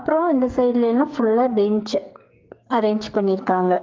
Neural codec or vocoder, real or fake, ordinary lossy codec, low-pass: codec, 44.1 kHz, 2.6 kbps, SNAC; fake; Opus, 32 kbps; 7.2 kHz